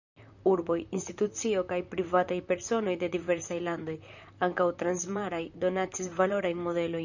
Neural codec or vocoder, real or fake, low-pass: vocoder, 44.1 kHz, 128 mel bands, Pupu-Vocoder; fake; 7.2 kHz